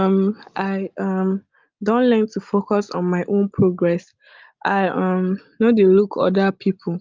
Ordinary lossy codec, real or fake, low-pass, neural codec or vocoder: Opus, 32 kbps; real; 7.2 kHz; none